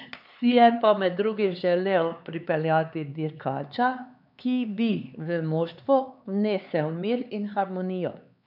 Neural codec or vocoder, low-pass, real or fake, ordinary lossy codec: codec, 16 kHz, 4 kbps, X-Codec, HuBERT features, trained on LibriSpeech; 5.4 kHz; fake; none